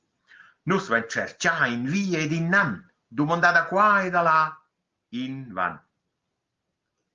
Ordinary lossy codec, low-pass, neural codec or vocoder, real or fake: Opus, 32 kbps; 7.2 kHz; none; real